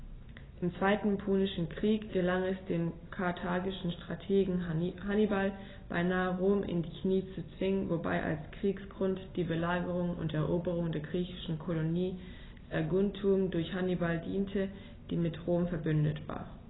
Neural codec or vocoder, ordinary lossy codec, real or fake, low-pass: none; AAC, 16 kbps; real; 7.2 kHz